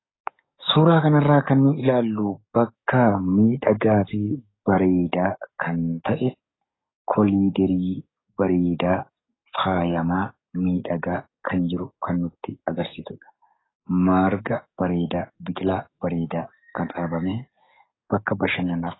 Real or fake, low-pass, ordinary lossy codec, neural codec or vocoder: fake; 7.2 kHz; AAC, 16 kbps; codec, 44.1 kHz, 7.8 kbps, DAC